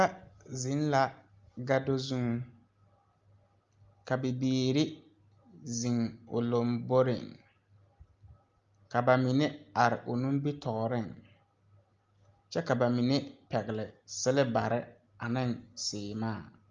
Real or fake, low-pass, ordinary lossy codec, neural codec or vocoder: real; 7.2 kHz; Opus, 32 kbps; none